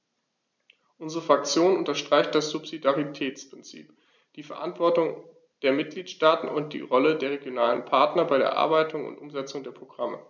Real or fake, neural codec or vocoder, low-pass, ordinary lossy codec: real; none; 7.2 kHz; none